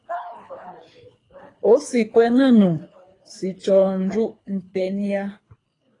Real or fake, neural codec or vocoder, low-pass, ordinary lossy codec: fake; codec, 24 kHz, 3 kbps, HILCodec; 10.8 kHz; AAC, 32 kbps